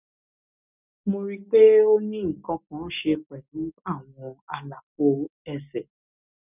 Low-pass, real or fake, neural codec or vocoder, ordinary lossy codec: 3.6 kHz; real; none; none